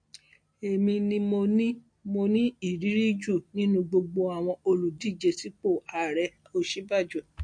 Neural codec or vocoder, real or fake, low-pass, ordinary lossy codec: none; real; 9.9 kHz; MP3, 48 kbps